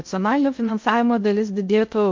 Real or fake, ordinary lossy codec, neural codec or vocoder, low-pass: fake; MP3, 48 kbps; codec, 16 kHz in and 24 kHz out, 0.6 kbps, FocalCodec, streaming, 2048 codes; 7.2 kHz